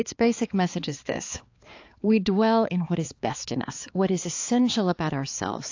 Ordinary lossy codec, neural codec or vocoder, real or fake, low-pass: AAC, 48 kbps; codec, 16 kHz, 4 kbps, X-Codec, HuBERT features, trained on balanced general audio; fake; 7.2 kHz